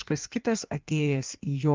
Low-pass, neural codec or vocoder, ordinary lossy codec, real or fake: 7.2 kHz; codec, 16 kHz in and 24 kHz out, 1.1 kbps, FireRedTTS-2 codec; Opus, 32 kbps; fake